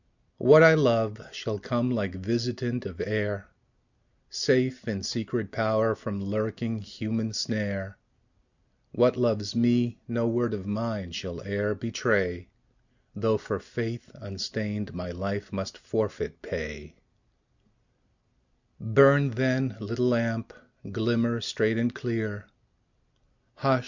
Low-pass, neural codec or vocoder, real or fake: 7.2 kHz; none; real